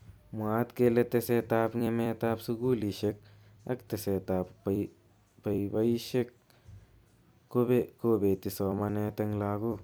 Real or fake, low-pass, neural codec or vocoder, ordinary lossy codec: fake; none; vocoder, 44.1 kHz, 128 mel bands every 256 samples, BigVGAN v2; none